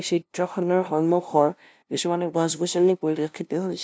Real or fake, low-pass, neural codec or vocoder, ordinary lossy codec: fake; none; codec, 16 kHz, 0.5 kbps, FunCodec, trained on LibriTTS, 25 frames a second; none